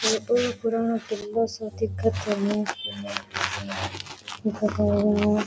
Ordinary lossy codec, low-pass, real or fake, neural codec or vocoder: none; none; real; none